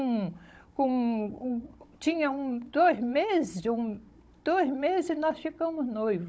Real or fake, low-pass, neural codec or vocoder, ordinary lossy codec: fake; none; codec, 16 kHz, 16 kbps, FunCodec, trained on Chinese and English, 50 frames a second; none